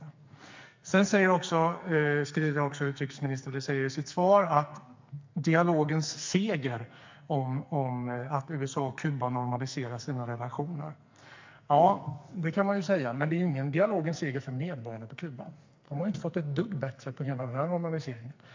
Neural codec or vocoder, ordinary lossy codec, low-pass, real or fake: codec, 44.1 kHz, 2.6 kbps, SNAC; MP3, 64 kbps; 7.2 kHz; fake